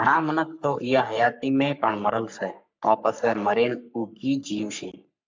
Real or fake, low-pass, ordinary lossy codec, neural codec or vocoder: fake; 7.2 kHz; MP3, 64 kbps; codec, 44.1 kHz, 3.4 kbps, Pupu-Codec